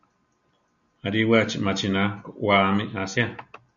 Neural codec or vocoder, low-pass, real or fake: none; 7.2 kHz; real